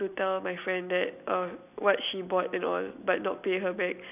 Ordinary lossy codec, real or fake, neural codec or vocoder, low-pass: none; real; none; 3.6 kHz